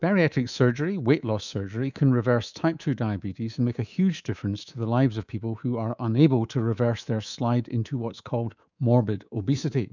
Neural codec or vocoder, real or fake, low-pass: codec, 24 kHz, 3.1 kbps, DualCodec; fake; 7.2 kHz